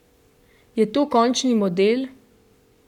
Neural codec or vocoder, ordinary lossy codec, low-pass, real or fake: codec, 44.1 kHz, 7.8 kbps, Pupu-Codec; none; 19.8 kHz; fake